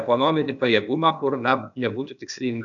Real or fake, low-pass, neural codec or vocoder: fake; 7.2 kHz; codec, 16 kHz, 0.8 kbps, ZipCodec